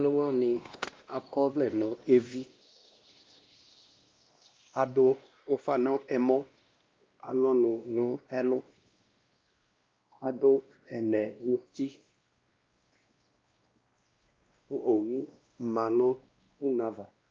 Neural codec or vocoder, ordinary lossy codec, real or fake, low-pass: codec, 16 kHz, 1 kbps, X-Codec, WavLM features, trained on Multilingual LibriSpeech; Opus, 24 kbps; fake; 7.2 kHz